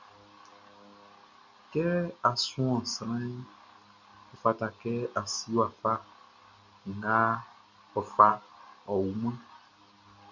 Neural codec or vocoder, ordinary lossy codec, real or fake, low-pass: none; MP3, 64 kbps; real; 7.2 kHz